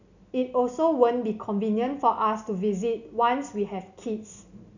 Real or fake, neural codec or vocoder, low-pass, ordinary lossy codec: real; none; 7.2 kHz; none